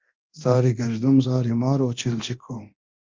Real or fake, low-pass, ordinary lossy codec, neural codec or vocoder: fake; 7.2 kHz; Opus, 32 kbps; codec, 24 kHz, 0.9 kbps, DualCodec